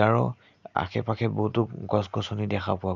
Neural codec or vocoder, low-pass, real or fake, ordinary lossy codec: none; 7.2 kHz; real; none